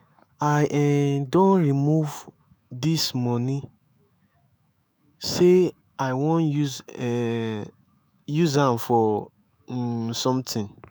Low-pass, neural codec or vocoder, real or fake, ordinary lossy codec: none; autoencoder, 48 kHz, 128 numbers a frame, DAC-VAE, trained on Japanese speech; fake; none